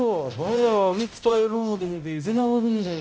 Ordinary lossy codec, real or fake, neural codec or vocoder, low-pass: none; fake; codec, 16 kHz, 0.5 kbps, X-Codec, HuBERT features, trained on balanced general audio; none